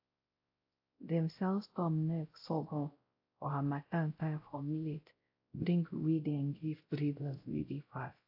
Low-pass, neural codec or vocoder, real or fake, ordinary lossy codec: 5.4 kHz; codec, 16 kHz, 0.5 kbps, X-Codec, WavLM features, trained on Multilingual LibriSpeech; fake; AAC, 32 kbps